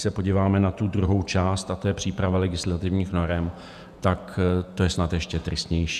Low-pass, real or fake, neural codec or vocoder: 14.4 kHz; fake; vocoder, 48 kHz, 128 mel bands, Vocos